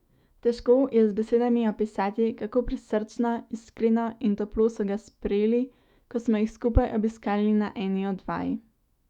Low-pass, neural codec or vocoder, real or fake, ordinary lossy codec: 19.8 kHz; autoencoder, 48 kHz, 128 numbers a frame, DAC-VAE, trained on Japanese speech; fake; Opus, 64 kbps